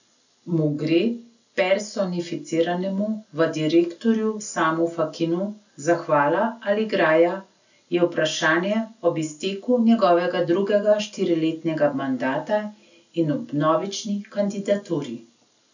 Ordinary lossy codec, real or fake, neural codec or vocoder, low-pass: none; real; none; 7.2 kHz